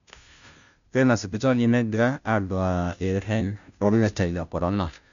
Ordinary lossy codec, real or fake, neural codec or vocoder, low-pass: MP3, 96 kbps; fake; codec, 16 kHz, 0.5 kbps, FunCodec, trained on Chinese and English, 25 frames a second; 7.2 kHz